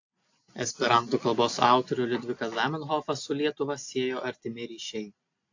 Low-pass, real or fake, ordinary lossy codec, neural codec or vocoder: 7.2 kHz; real; AAC, 48 kbps; none